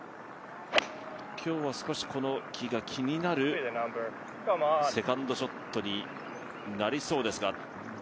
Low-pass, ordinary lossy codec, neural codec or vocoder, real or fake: none; none; none; real